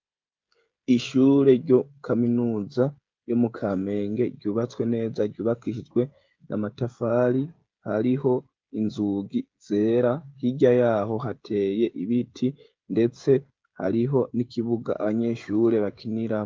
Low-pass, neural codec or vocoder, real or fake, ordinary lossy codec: 7.2 kHz; codec, 16 kHz, 16 kbps, FreqCodec, smaller model; fake; Opus, 32 kbps